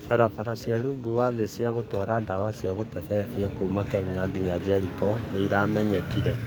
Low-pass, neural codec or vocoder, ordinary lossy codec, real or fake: none; codec, 44.1 kHz, 2.6 kbps, SNAC; none; fake